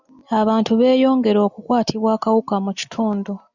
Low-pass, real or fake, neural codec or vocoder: 7.2 kHz; real; none